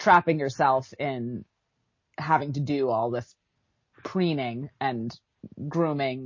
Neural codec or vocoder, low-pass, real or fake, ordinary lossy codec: none; 7.2 kHz; real; MP3, 32 kbps